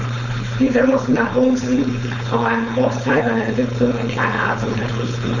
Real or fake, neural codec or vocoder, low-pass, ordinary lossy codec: fake; codec, 16 kHz, 4.8 kbps, FACodec; 7.2 kHz; none